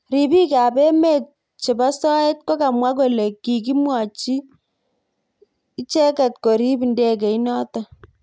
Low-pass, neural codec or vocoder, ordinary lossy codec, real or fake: none; none; none; real